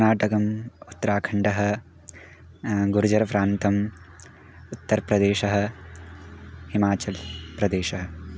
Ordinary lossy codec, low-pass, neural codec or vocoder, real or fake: none; none; none; real